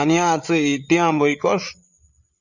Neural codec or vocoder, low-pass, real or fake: codec, 16 kHz, 8 kbps, FreqCodec, larger model; 7.2 kHz; fake